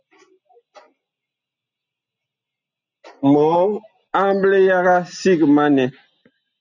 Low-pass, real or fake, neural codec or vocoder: 7.2 kHz; real; none